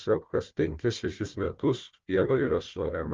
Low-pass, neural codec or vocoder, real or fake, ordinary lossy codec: 7.2 kHz; codec, 16 kHz, 1 kbps, FunCodec, trained on Chinese and English, 50 frames a second; fake; Opus, 32 kbps